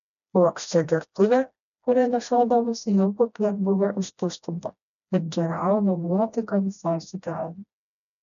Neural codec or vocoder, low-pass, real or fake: codec, 16 kHz, 1 kbps, FreqCodec, smaller model; 7.2 kHz; fake